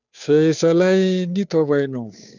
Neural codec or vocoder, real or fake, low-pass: codec, 16 kHz, 2 kbps, FunCodec, trained on Chinese and English, 25 frames a second; fake; 7.2 kHz